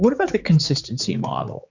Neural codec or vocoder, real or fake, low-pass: codec, 16 kHz, 4 kbps, X-Codec, HuBERT features, trained on general audio; fake; 7.2 kHz